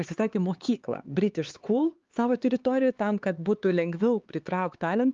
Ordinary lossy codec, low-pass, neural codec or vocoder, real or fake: Opus, 32 kbps; 7.2 kHz; codec, 16 kHz, 4 kbps, X-Codec, HuBERT features, trained on LibriSpeech; fake